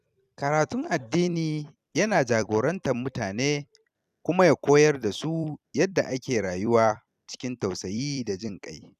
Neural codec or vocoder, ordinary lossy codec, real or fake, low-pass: vocoder, 44.1 kHz, 128 mel bands every 256 samples, BigVGAN v2; none; fake; 14.4 kHz